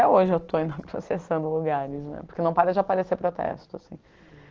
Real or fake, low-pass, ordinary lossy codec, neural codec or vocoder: real; 7.2 kHz; Opus, 16 kbps; none